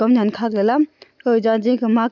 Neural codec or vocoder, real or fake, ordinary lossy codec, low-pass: none; real; none; 7.2 kHz